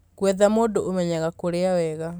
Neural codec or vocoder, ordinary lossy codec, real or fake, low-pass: none; none; real; none